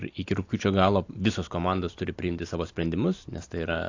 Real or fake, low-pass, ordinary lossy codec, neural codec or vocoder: real; 7.2 kHz; AAC, 48 kbps; none